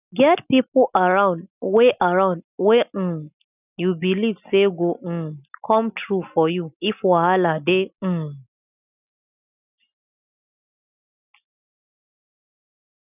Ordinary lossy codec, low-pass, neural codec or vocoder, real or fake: none; 3.6 kHz; none; real